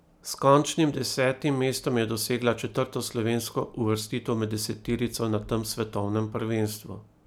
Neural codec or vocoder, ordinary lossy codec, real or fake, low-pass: none; none; real; none